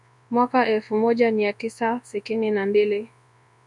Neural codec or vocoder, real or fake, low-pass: codec, 24 kHz, 0.9 kbps, WavTokenizer, large speech release; fake; 10.8 kHz